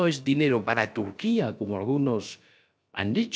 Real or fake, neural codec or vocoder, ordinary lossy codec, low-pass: fake; codec, 16 kHz, about 1 kbps, DyCAST, with the encoder's durations; none; none